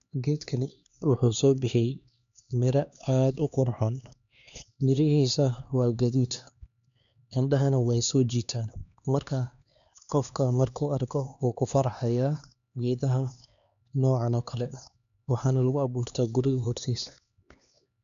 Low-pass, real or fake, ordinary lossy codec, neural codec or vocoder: 7.2 kHz; fake; none; codec, 16 kHz, 2 kbps, X-Codec, HuBERT features, trained on LibriSpeech